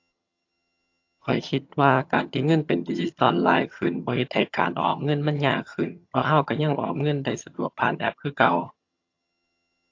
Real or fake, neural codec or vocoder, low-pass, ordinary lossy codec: fake; vocoder, 22.05 kHz, 80 mel bands, HiFi-GAN; 7.2 kHz; AAC, 48 kbps